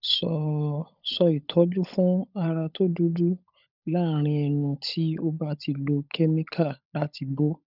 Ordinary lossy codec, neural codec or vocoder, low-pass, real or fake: none; codec, 16 kHz, 16 kbps, FunCodec, trained on LibriTTS, 50 frames a second; 5.4 kHz; fake